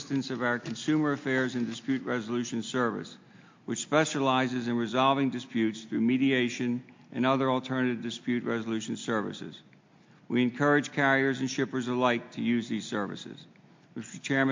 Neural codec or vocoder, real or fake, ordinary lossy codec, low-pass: none; real; MP3, 48 kbps; 7.2 kHz